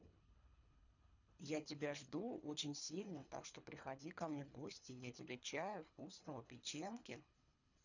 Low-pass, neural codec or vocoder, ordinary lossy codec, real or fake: 7.2 kHz; codec, 24 kHz, 3 kbps, HILCodec; none; fake